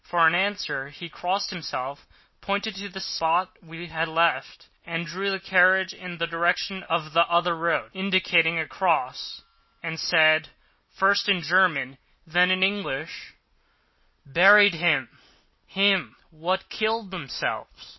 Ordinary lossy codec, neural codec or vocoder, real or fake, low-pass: MP3, 24 kbps; none; real; 7.2 kHz